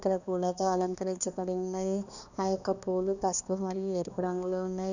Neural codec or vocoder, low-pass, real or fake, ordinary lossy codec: codec, 16 kHz, 2 kbps, X-Codec, HuBERT features, trained on balanced general audio; 7.2 kHz; fake; none